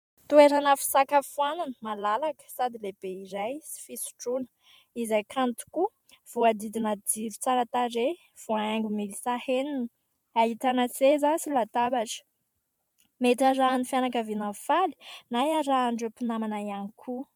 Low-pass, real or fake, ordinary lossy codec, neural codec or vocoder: 19.8 kHz; fake; MP3, 96 kbps; vocoder, 44.1 kHz, 128 mel bands every 512 samples, BigVGAN v2